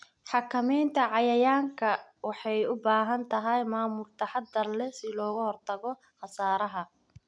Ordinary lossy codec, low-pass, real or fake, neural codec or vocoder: none; 9.9 kHz; real; none